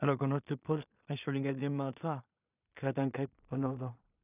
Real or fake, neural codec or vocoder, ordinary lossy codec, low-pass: fake; codec, 16 kHz in and 24 kHz out, 0.4 kbps, LongCat-Audio-Codec, two codebook decoder; none; 3.6 kHz